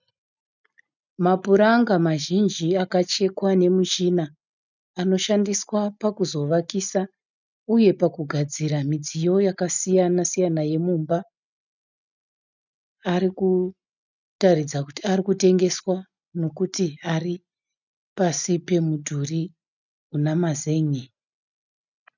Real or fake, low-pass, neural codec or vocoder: real; 7.2 kHz; none